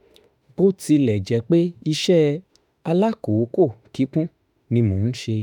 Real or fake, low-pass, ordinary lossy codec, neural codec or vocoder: fake; 19.8 kHz; none; autoencoder, 48 kHz, 32 numbers a frame, DAC-VAE, trained on Japanese speech